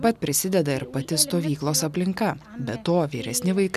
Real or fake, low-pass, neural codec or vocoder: real; 14.4 kHz; none